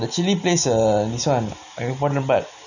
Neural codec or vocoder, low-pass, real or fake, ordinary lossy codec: none; 7.2 kHz; real; none